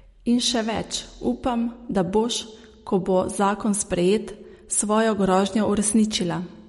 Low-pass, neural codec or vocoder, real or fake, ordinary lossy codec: 14.4 kHz; none; real; MP3, 48 kbps